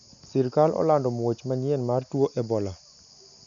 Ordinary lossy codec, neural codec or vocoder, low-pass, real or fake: none; none; 7.2 kHz; real